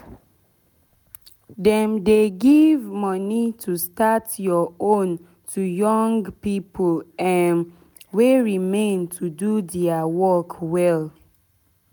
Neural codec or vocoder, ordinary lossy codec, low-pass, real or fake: none; none; none; real